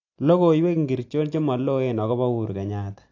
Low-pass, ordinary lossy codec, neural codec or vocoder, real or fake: 7.2 kHz; AAC, 48 kbps; none; real